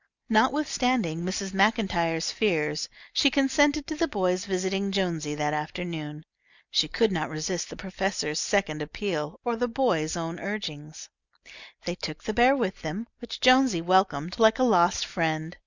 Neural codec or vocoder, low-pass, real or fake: none; 7.2 kHz; real